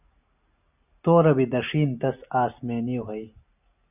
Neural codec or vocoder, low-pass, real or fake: none; 3.6 kHz; real